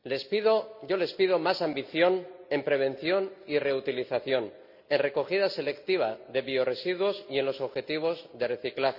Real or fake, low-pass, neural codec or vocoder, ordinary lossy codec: real; 5.4 kHz; none; none